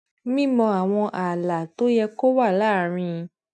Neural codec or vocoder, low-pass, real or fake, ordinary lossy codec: none; none; real; none